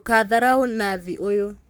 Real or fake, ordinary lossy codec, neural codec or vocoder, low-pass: fake; none; codec, 44.1 kHz, 3.4 kbps, Pupu-Codec; none